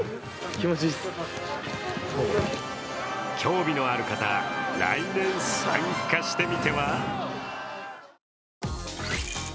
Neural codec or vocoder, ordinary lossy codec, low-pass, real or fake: none; none; none; real